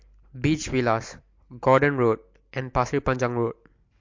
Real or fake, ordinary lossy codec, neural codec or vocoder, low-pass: real; MP3, 48 kbps; none; 7.2 kHz